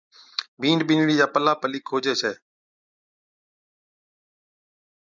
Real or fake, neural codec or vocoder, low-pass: real; none; 7.2 kHz